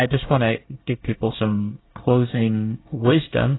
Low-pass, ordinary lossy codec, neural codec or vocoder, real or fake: 7.2 kHz; AAC, 16 kbps; codec, 24 kHz, 1 kbps, SNAC; fake